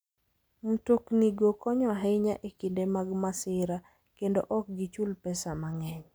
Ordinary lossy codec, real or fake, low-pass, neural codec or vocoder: none; real; none; none